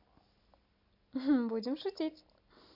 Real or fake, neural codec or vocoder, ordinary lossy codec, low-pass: real; none; none; 5.4 kHz